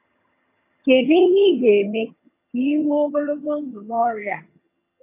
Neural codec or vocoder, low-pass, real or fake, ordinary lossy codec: vocoder, 22.05 kHz, 80 mel bands, HiFi-GAN; 3.6 kHz; fake; MP3, 24 kbps